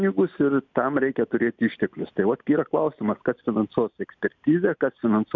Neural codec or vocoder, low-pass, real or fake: none; 7.2 kHz; real